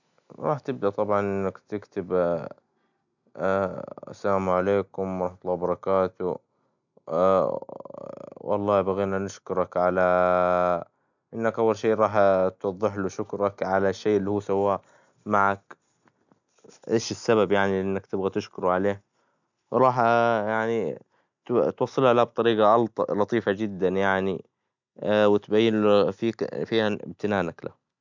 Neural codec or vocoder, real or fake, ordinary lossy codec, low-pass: none; real; none; 7.2 kHz